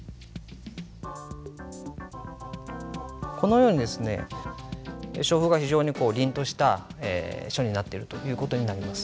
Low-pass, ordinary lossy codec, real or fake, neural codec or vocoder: none; none; real; none